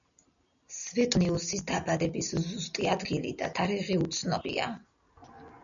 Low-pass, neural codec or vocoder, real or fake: 7.2 kHz; none; real